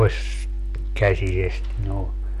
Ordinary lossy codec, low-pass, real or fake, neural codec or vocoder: none; 14.4 kHz; real; none